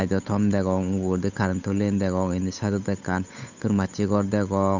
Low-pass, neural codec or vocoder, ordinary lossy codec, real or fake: 7.2 kHz; codec, 16 kHz, 8 kbps, FunCodec, trained on Chinese and English, 25 frames a second; none; fake